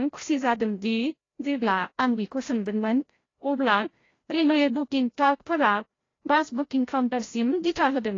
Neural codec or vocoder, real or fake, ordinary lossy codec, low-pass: codec, 16 kHz, 0.5 kbps, FreqCodec, larger model; fake; AAC, 32 kbps; 7.2 kHz